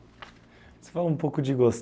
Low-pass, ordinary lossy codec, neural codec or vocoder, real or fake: none; none; none; real